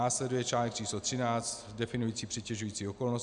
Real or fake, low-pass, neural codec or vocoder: real; 9.9 kHz; none